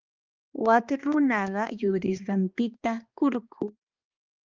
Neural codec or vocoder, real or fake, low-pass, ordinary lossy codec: codec, 16 kHz, 2 kbps, X-Codec, HuBERT features, trained on balanced general audio; fake; 7.2 kHz; Opus, 32 kbps